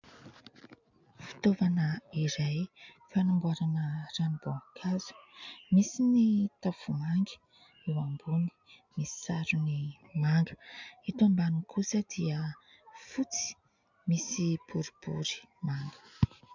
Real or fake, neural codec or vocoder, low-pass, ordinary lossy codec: real; none; 7.2 kHz; MP3, 64 kbps